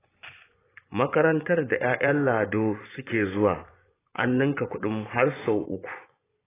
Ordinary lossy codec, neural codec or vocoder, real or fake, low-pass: AAC, 16 kbps; vocoder, 24 kHz, 100 mel bands, Vocos; fake; 3.6 kHz